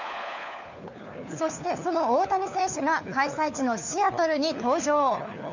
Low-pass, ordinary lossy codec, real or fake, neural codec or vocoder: 7.2 kHz; none; fake; codec, 16 kHz, 4 kbps, FunCodec, trained on LibriTTS, 50 frames a second